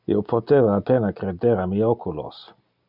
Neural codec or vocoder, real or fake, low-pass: none; real; 5.4 kHz